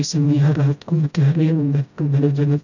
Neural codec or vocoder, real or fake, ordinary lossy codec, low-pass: codec, 16 kHz, 0.5 kbps, FreqCodec, smaller model; fake; none; 7.2 kHz